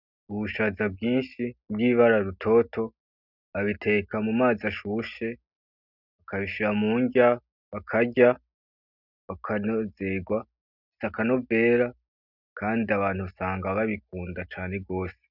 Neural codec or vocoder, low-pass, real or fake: none; 5.4 kHz; real